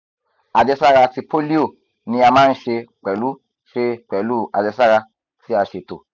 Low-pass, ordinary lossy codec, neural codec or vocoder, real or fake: 7.2 kHz; none; none; real